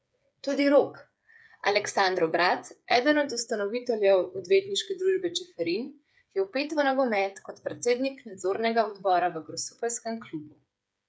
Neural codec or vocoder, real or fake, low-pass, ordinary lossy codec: codec, 16 kHz, 8 kbps, FreqCodec, smaller model; fake; none; none